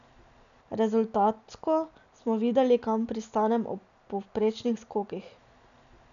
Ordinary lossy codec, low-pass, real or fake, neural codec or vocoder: none; 7.2 kHz; real; none